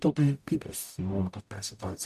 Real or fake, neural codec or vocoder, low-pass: fake; codec, 44.1 kHz, 0.9 kbps, DAC; 14.4 kHz